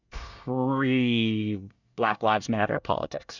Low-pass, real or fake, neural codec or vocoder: 7.2 kHz; fake; codec, 24 kHz, 1 kbps, SNAC